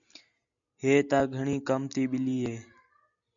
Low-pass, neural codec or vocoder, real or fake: 7.2 kHz; none; real